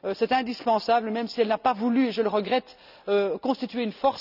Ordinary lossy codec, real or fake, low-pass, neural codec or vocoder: none; real; 5.4 kHz; none